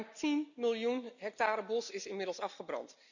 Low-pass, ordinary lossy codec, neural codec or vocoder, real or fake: 7.2 kHz; none; none; real